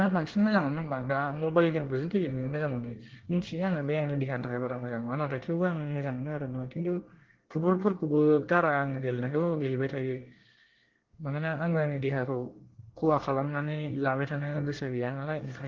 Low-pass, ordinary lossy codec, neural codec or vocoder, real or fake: 7.2 kHz; Opus, 16 kbps; codec, 24 kHz, 1 kbps, SNAC; fake